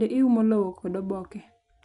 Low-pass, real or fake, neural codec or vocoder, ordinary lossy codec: 10.8 kHz; real; none; MP3, 64 kbps